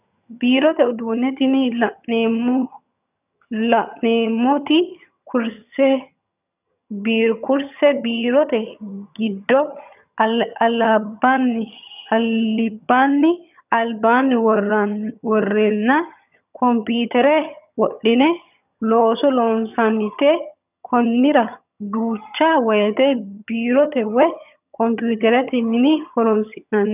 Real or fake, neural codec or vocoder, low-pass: fake; vocoder, 22.05 kHz, 80 mel bands, HiFi-GAN; 3.6 kHz